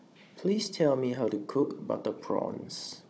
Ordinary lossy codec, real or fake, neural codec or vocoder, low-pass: none; fake; codec, 16 kHz, 16 kbps, FunCodec, trained on Chinese and English, 50 frames a second; none